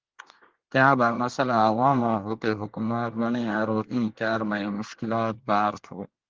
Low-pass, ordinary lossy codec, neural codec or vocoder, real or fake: 7.2 kHz; Opus, 16 kbps; codec, 24 kHz, 1 kbps, SNAC; fake